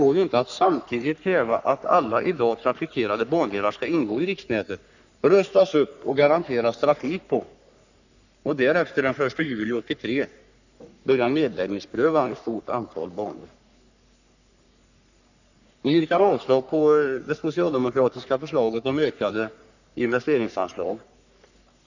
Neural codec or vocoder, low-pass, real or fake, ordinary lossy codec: codec, 44.1 kHz, 3.4 kbps, Pupu-Codec; 7.2 kHz; fake; none